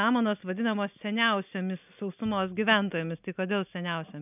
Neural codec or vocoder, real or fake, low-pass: none; real; 3.6 kHz